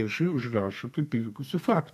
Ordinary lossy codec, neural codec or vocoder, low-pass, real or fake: AAC, 96 kbps; codec, 32 kHz, 1.9 kbps, SNAC; 14.4 kHz; fake